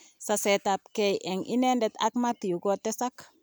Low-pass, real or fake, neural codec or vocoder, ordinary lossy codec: none; real; none; none